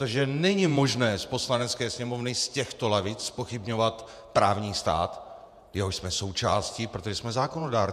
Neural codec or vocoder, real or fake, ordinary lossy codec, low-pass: vocoder, 48 kHz, 128 mel bands, Vocos; fake; MP3, 96 kbps; 14.4 kHz